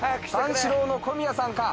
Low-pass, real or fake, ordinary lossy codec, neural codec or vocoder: none; real; none; none